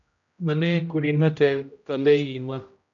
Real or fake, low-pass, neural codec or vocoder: fake; 7.2 kHz; codec, 16 kHz, 0.5 kbps, X-Codec, HuBERT features, trained on general audio